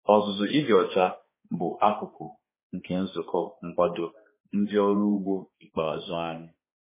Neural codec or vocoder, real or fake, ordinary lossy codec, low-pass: codec, 16 kHz, 2 kbps, X-Codec, HuBERT features, trained on balanced general audio; fake; MP3, 16 kbps; 3.6 kHz